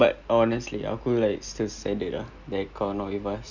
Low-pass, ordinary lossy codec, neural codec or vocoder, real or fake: 7.2 kHz; none; none; real